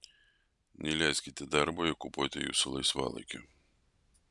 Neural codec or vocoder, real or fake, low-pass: none; real; 10.8 kHz